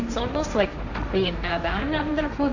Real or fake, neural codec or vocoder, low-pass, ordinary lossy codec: fake; codec, 16 kHz, 1.1 kbps, Voila-Tokenizer; 7.2 kHz; none